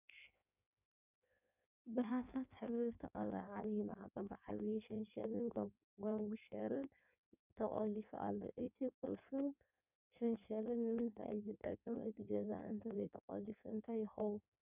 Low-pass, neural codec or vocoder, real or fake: 3.6 kHz; codec, 16 kHz in and 24 kHz out, 1.1 kbps, FireRedTTS-2 codec; fake